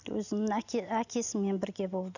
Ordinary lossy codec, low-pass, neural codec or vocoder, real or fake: none; 7.2 kHz; none; real